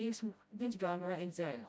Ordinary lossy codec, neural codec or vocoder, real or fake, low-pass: none; codec, 16 kHz, 0.5 kbps, FreqCodec, smaller model; fake; none